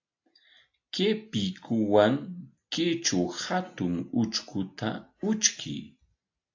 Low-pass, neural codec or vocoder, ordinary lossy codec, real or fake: 7.2 kHz; none; AAC, 48 kbps; real